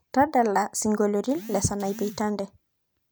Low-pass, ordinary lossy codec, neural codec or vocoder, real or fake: none; none; none; real